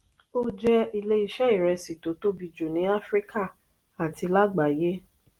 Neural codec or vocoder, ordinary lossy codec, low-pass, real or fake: none; Opus, 24 kbps; 19.8 kHz; real